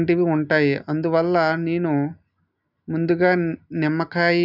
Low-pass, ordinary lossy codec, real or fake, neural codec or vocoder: 5.4 kHz; none; real; none